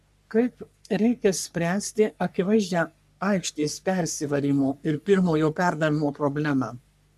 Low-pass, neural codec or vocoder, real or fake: 14.4 kHz; codec, 44.1 kHz, 3.4 kbps, Pupu-Codec; fake